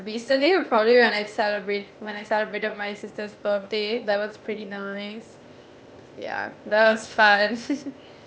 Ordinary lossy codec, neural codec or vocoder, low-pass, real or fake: none; codec, 16 kHz, 0.8 kbps, ZipCodec; none; fake